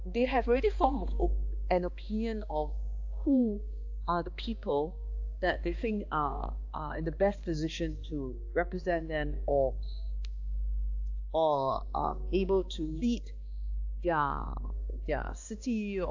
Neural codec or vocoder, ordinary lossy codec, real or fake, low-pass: codec, 16 kHz, 2 kbps, X-Codec, HuBERT features, trained on balanced general audio; AAC, 48 kbps; fake; 7.2 kHz